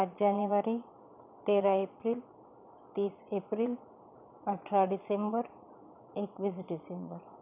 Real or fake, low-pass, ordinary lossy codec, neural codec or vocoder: fake; 3.6 kHz; none; vocoder, 22.05 kHz, 80 mel bands, Vocos